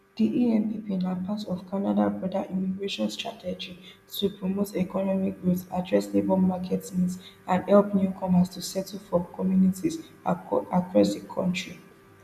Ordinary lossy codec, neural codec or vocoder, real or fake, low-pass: none; none; real; 14.4 kHz